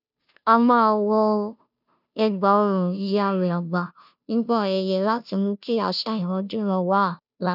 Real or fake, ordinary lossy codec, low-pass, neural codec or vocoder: fake; none; 5.4 kHz; codec, 16 kHz, 0.5 kbps, FunCodec, trained on Chinese and English, 25 frames a second